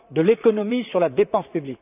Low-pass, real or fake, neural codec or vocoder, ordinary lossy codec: 3.6 kHz; fake; vocoder, 44.1 kHz, 128 mel bands, Pupu-Vocoder; none